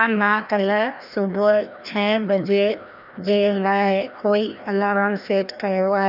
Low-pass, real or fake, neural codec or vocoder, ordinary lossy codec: 5.4 kHz; fake; codec, 16 kHz, 1 kbps, FreqCodec, larger model; none